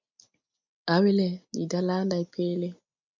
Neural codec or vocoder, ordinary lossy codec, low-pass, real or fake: none; MP3, 64 kbps; 7.2 kHz; real